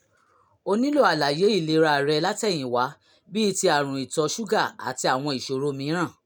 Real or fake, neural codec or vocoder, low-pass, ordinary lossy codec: real; none; none; none